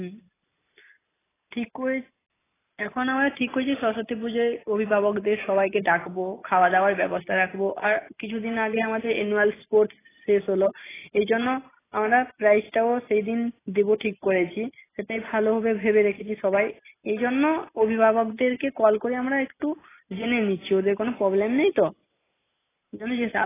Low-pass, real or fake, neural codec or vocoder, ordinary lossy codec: 3.6 kHz; real; none; AAC, 16 kbps